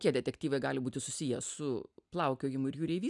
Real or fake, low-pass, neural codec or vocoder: real; 10.8 kHz; none